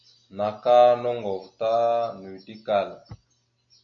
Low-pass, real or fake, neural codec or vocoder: 7.2 kHz; real; none